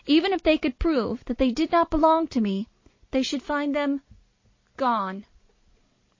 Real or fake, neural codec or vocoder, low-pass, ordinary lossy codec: real; none; 7.2 kHz; MP3, 32 kbps